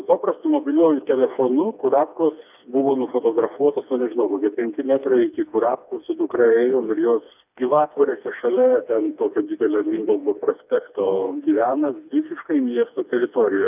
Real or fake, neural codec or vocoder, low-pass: fake; codec, 16 kHz, 2 kbps, FreqCodec, smaller model; 3.6 kHz